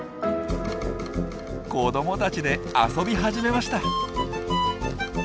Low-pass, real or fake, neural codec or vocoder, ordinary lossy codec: none; real; none; none